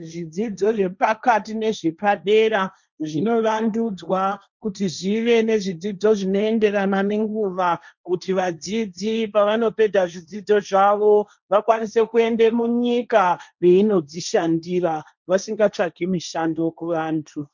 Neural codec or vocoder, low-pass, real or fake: codec, 16 kHz, 1.1 kbps, Voila-Tokenizer; 7.2 kHz; fake